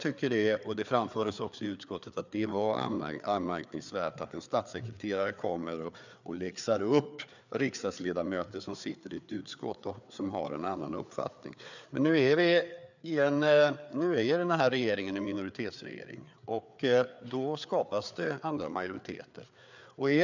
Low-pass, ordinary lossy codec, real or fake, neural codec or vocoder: 7.2 kHz; none; fake; codec, 16 kHz, 4 kbps, FreqCodec, larger model